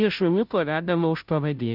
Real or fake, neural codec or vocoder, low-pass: fake; codec, 16 kHz, 0.5 kbps, FunCodec, trained on Chinese and English, 25 frames a second; 5.4 kHz